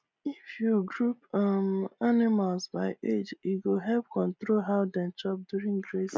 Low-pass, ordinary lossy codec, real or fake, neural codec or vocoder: none; none; real; none